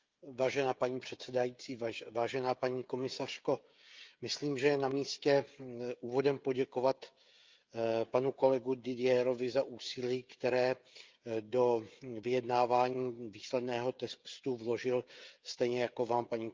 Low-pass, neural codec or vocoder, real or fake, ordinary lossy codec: 7.2 kHz; codec, 16 kHz, 16 kbps, FreqCodec, smaller model; fake; Opus, 24 kbps